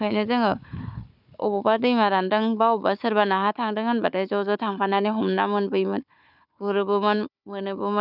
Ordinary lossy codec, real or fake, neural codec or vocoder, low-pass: none; fake; codec, 16 kHz, 4 kbps, FunCodec, trained on Chinese and English, 50 frames a second; 5.4 kHz